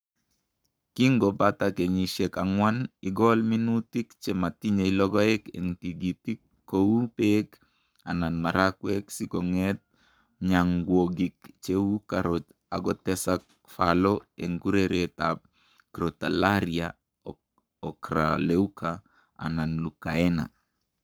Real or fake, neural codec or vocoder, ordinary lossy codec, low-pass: fake; codec, 44.1 kHz, 7.8 kbps, Pupu-Codec; none; none